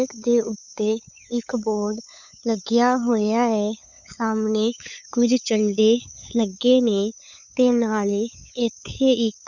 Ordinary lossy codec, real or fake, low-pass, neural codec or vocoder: none; fake; 7.2 kHz; codec, 16 kHz, 8 kbps, FunCodec, trained on LibriTTS, 25 frames a second